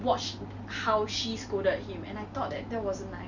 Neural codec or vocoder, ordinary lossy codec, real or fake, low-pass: none; none; real; 7.2 kHz